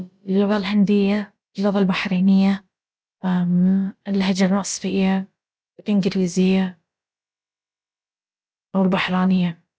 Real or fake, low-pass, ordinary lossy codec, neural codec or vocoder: fake; none; none; codec, 16 kHz, about 1 kbps, DyCAST, with the encoder's durations